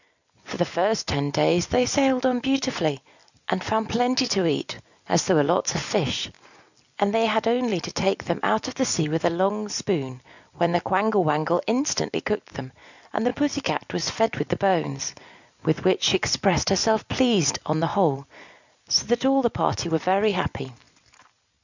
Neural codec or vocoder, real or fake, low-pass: none; real; 7.2 kHz